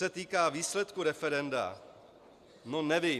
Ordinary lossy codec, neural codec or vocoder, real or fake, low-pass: Opus, 64 kbps; none; real; 14.4 kHz